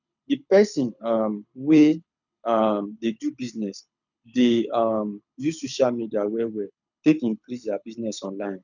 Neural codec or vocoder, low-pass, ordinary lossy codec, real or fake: codec, 24 kHz, 6 kbps, HILCodec; 7.2 kHz; none; fake